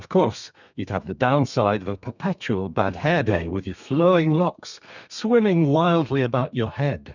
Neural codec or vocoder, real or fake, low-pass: codec, 32 kHz, 1.9 kbps, SNAC; fake; 7.2 kHz